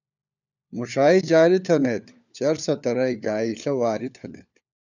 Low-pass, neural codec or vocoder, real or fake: 7.2 kHz; codec, 16 kHz, 4 kbps, FunCodec, trained on LibriTTS, 50 frames a second; fake